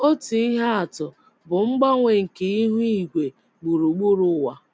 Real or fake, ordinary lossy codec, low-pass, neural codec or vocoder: real; none; none; none